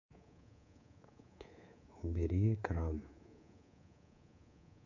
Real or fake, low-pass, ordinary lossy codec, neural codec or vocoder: fake; 7.2 kHz; none; codec, 24 kHz, 3.1 kbps, DualCodec